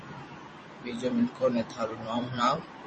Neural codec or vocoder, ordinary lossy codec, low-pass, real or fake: vocoder, 44.1 kHz, 128 mel bands every 256 samples, BigVGAN v2; MP3, 32 kbps; 10.8 kHz; fake